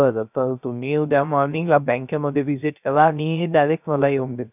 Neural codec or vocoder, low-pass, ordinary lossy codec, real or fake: codec, 16 kHz, 0.3 kbps, FocalCodec; 3.6 kHz; none; fake